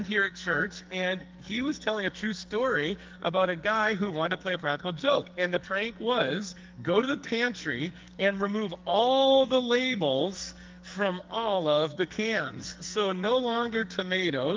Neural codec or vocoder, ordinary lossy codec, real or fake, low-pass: codec, 44.1 kHz, 2.6 kbps, SNAC; Opus, 32 kbps; fake; 7.2 kHz